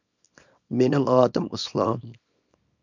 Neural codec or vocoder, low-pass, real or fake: codec, 24 kHz, 0.9 kbps, WavTokenizer, small release; 7.2 kHz; fake